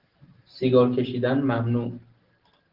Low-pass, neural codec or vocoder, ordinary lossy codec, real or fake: 5.4 kHz; none; Opus, 16 kbps; real